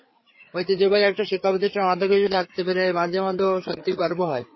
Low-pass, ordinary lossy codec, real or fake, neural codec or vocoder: 7.2 kHz; MP3, 24 kbps; fake; codec, 16 kHz, 4 kbps, FreqCodec, larger model